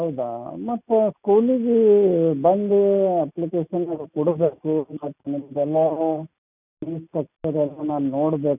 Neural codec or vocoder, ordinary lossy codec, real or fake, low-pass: none; none; real; 3.6 kHz